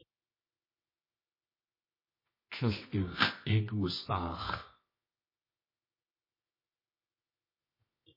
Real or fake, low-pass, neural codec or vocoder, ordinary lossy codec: fake; 5.4 kHz; codec, 24 kHz, 0.9 kbps, WavTokenizer, medium music audio release; MP3, 24 kbps